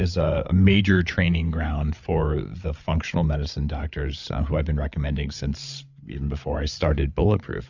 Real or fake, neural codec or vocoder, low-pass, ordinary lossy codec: fake; codec, 16 kHz, 8 kbps, FreqCodec, larger model; 7.2 kHz; Opus, 64 kbps